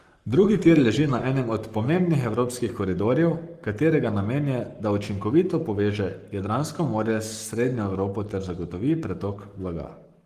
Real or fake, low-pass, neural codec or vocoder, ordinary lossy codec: fake; 14.4 kHz; codec, 44.1 kHz, 7.8 kbps, Pupu-Codec; Opus, 24 kbps